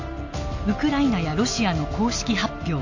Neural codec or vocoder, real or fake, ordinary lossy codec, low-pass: none; real; AAC, 48 kbps; 7.2 kHz